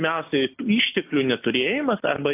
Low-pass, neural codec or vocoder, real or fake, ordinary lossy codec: 3.6 kHz; none; real; AAC, 32 kbps